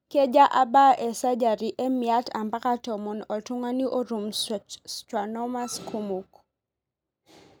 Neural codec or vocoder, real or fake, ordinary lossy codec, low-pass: none; real; none; none